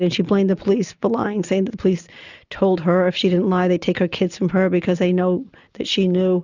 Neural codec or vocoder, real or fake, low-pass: none; real; 7.2 kHz